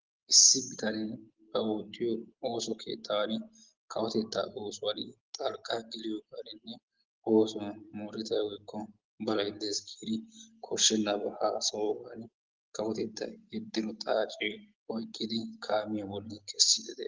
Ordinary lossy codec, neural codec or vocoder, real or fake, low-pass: Opus, 16 kbps; none; real; 7.2 kHz